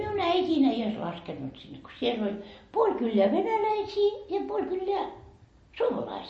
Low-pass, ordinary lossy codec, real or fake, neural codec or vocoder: 9.9 kHz; MP3, 32 kbps; real; none